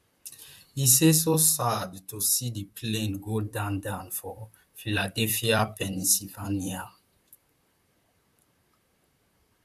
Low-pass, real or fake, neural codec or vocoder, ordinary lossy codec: 14.4 kHz; fake; vocoder, 44.1 kHz, 128 mel bands, Pupu-Vocoder; none